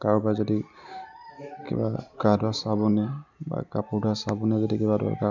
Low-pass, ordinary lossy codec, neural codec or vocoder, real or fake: 7.2 kHz; none; none; real